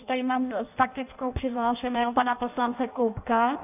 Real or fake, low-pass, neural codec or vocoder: fake; 3.6 kHz; codec, 16 kHz in and 24 kHz out, 0.6 kbps, FireRedTTS-2 codec